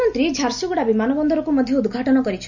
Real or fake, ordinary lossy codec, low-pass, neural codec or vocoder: real; none; 7.2 kHz; none